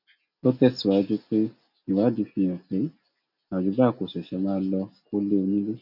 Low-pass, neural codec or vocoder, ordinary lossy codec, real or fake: 5.4 kHz; none; none; real